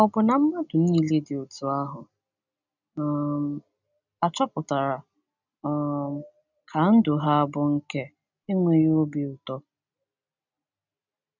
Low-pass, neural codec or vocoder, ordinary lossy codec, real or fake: 7.2 kHz; none; none; real